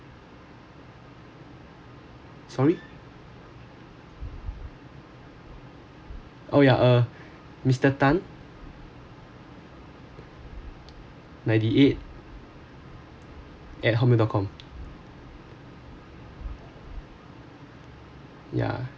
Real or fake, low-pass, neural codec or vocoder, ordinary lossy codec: real; none; none; none